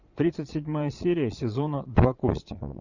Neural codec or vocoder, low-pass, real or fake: vocoder, 22.05 kHz, 80 mel bands, Vocos; 7.2 kHz; fake